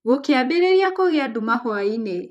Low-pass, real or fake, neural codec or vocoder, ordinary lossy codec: 14.4 kHz; fake; vocoder, 44.1 kHz, 128 mel bands, Pupu-Vocoder; none